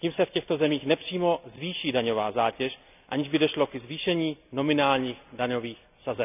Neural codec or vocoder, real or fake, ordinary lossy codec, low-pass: none; real; none; 3.6 kHz